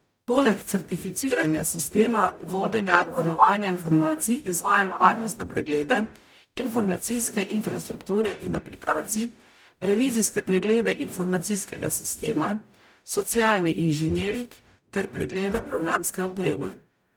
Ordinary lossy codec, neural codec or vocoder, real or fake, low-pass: none; codec, 44.1 kHz, 0.9 kbps, DAC; fake; none